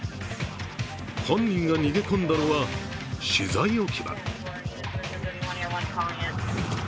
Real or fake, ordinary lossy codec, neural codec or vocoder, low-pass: real; none; none; none